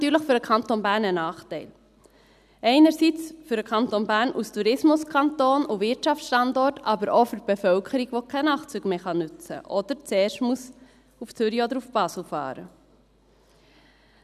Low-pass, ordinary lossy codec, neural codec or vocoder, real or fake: 14.4 kHz; none; none; real